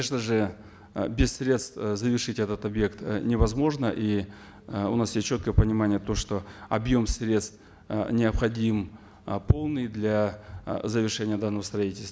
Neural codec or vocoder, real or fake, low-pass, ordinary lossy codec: none; real; none; none